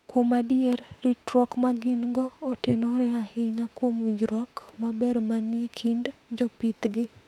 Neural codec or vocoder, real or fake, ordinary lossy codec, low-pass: autoencoder, 48 kHz, 32 numbers a frame, DAC-VAE, trained on Japanese speech; fake; none; 19.8 kHz